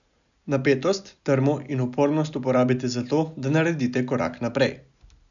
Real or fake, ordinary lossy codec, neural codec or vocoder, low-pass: real; none; none; 7.2 kHz